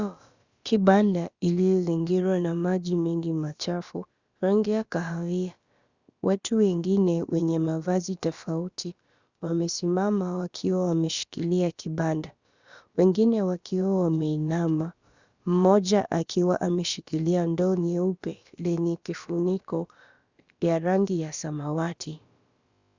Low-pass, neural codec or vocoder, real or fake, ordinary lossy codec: 7.2 kHz; codec, 16 kHz, about 1 kbps, DyCAST, with the encoder's durations; fake; Opus, 64 kbps